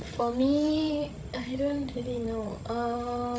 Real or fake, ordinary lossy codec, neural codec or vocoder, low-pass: fake; none; codec, 16 kHz, 16 kbps, FreqCodec, larger model; none